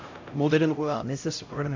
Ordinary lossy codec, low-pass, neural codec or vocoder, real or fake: AAC, 48 kbps; 7.2 kHz; codec, 16 kHz, 0.5 kbps, X-Codec, HuBERT features, trained on LibriSpeech; fake